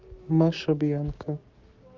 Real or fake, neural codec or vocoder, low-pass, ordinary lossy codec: fake; codec, 16 kHz, 6 kbps, DAC; 7.2 kHz; Opus, 32 kbps